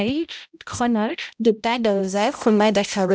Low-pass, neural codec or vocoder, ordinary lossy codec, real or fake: none; codec, 16 kHz, 0.5 kbps, X-Codec, HuBERT features, trained on balanced general audio; none; fake